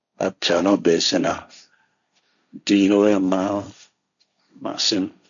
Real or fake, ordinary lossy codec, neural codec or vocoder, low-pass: fake; none; codec, 16 kHz, 1.1 kbps, Voila-Tokenizer; 7.2 kHz